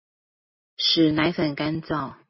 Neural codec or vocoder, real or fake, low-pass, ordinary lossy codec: none; real; 7.2 kHz; MP3, 24 kbps